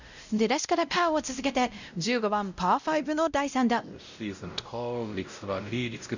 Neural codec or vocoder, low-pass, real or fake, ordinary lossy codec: codec, 16 kHz, 0.5 kbps, X-Codec, WavLM features, trained on Multilingual LibriSpeech; 7.2 kHz; fake; none